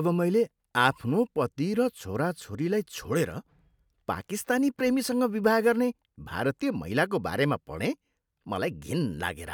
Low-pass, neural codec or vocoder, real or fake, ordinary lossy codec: none; none; real; none